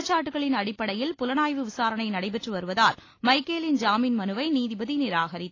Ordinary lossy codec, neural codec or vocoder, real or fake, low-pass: AAC, 32 kbps; none; real; 7.2 kHz